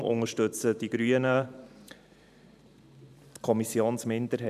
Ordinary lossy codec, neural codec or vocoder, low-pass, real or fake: none; vocoder, 44.1 kHz, 128 mel bands every 512 samples, BigVGAN v2; 14.4 kHz; fake